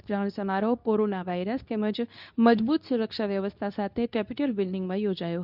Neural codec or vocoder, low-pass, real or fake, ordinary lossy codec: codec, 24 kHz, 0.9 kbps, WavTokenizer, medium speech release version 2; 5.4 kHz; fake; none